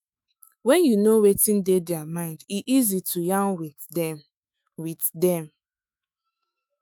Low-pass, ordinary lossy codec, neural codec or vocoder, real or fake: none; none; autoencoder, 48 kHz, 128 numbers a frame, DAC-VAE, trained on Japanese speech; fake